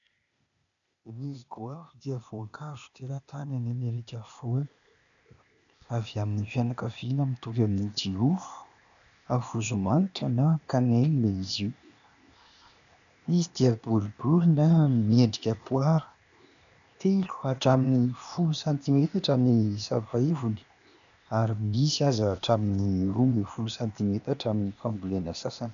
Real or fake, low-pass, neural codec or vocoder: fake; 7.2 kHz; codec, 16 kHz, 0.8 kbps, ZipCodec